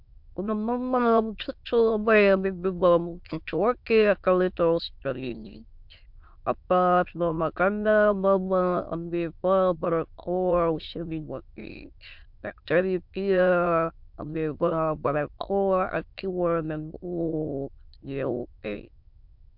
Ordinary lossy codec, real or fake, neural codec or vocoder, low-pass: MP3, 48 kbps; fake; autoencoder, 22.05 kHz, a latent of 192 numbers a frame, VITS, trained on many speakers; 5.4 kHz